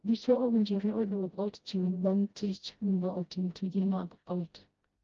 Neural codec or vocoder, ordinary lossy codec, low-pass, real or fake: codec, 16 kHz, 0.5 kbps, FreqCodec, smaller model; Opus, 24 kbps; 7.2 kHz; fake